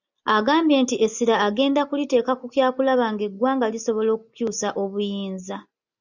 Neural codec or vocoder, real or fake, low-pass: none; real; 7.2 kHz